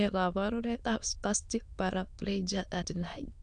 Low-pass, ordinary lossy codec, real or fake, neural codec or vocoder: 9.9 kHz; none; fake; autoencoder, 22.05 kHz, a latent of 192 numbers a frame, VITS, trained on many speakers